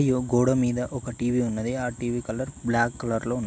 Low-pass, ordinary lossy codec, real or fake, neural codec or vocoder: none; none; real; none